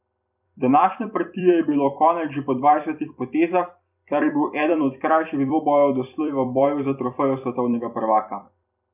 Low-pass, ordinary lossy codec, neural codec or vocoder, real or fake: 3.6 kHz; none; vocoder, 44.1 kHz, 128 mel bands every 256 samples, BigVGAN v2; fake